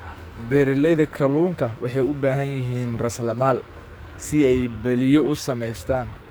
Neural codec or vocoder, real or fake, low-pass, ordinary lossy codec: codec, 44.1 kHz, 2.6 kbps, SNAC; fake; none; none